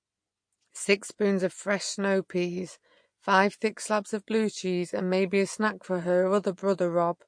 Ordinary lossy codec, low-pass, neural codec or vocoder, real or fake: MP3, 48 kbps; 9.9 kHz; vocoder, 24 kHz, 100 mel bands, Vocos; fake